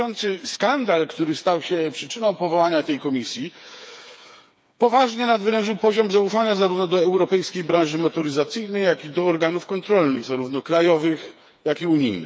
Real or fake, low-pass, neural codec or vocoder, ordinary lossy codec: fake; none; codec, 16 kHz, 4 kbps, FreqCodec, smaller model; none